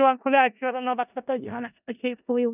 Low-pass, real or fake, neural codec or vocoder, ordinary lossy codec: 3.6 kHz; fake; codec, 16 kHz in and 24 kHz out, 0.4 kbps, LongCat-Audio-Codec, four codebook decoder; none